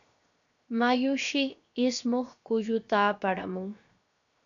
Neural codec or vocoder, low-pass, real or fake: codec, 16 kHz, 0.7 kbps, FocalCodec; 7.2 kHz; fake